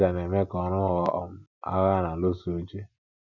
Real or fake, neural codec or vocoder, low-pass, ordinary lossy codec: real; none; 7.2 kHz; none